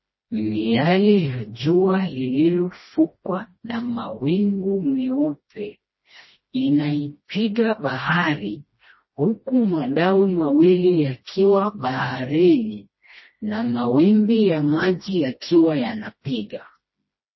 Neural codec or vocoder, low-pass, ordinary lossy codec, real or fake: codec, 16 kHz, 1 kbps, FreqCodec, smaller model; 7.2 kHz; MP3, 24 kbps; fake